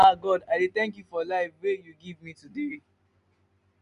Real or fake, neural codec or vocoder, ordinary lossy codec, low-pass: real; none; MP3, 96 kbps; 10.8 kHz